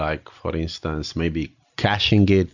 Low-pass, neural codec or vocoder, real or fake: 7.2 kHz; none; real